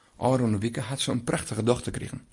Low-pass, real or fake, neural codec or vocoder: 10.8 kHz; real; none